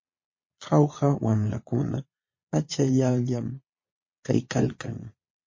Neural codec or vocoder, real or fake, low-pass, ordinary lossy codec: vocoder, 22.05 kHz, 80 mel bands, Vocos; fake; 7.2 kHz; MP3, 32 kbps